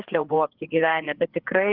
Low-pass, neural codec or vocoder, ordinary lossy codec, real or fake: 5.4 kHz; codec, 16 kHz, 4 kbps, FreqCodec, larger model; Opus, 16 kbps; fake